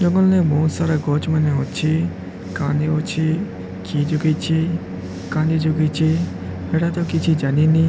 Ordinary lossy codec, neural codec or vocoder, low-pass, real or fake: none; none; none; real